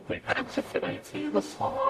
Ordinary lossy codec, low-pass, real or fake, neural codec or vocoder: AAC, 64 kbps; 14.4 kHz; fake; codec, 44.1 kHz, 0.9 kbps, DAC